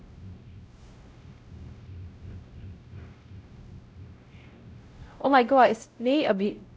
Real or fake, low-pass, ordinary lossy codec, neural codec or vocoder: fake; none; none; codec, 16 kHz, 0.5 kbps, X-Codec, WavLM features, trained on Multilingual LibriSpeech